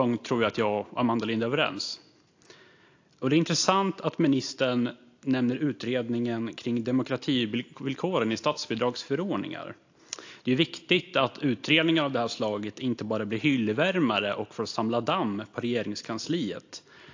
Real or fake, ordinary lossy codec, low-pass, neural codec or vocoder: real; AAC, 48 kbps; 7.2 kHz; none